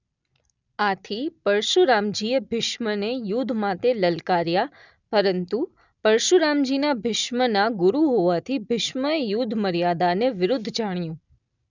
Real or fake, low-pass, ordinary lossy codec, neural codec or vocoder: real; 7.2 kHz; none; none